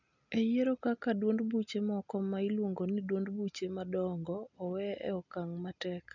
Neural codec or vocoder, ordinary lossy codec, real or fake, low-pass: none; none; real; 7.2 kHz